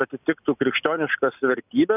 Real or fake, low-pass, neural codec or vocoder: real; 3.6 kHz; none